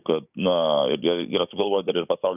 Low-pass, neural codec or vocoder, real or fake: 3.6 kHz; codec, 16 kHz, 6 kbps, DAC; fake